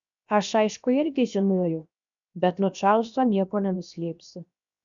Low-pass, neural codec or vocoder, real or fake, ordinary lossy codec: 7.2 kHz; codec, 16 kHz, 0.7 kbps, FocalCodec; fake; MP3, 96 kbps